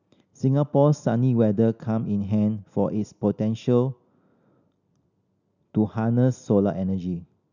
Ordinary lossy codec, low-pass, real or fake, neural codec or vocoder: none; 7.2 kHz; real; none